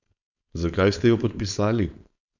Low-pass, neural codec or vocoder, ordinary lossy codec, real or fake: 7.2 kHz; codec, 16 kHz, 4.8 kbps, FACodec; none; fake